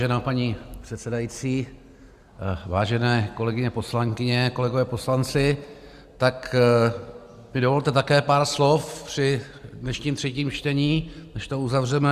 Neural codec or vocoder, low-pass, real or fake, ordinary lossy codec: none; 14.4 kHz; real; Opus, 64 kbps